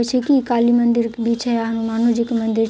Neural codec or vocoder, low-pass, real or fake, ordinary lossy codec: none; none; real; none